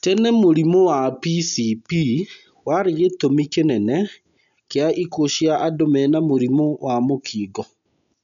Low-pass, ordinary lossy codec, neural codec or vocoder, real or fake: 7.2 kHz; none; none; real